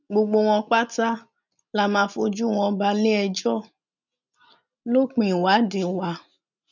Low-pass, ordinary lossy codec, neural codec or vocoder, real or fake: 7.2 kHz; none; none; real